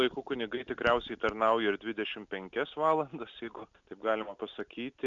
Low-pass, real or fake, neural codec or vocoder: 7.2 kHz; real; none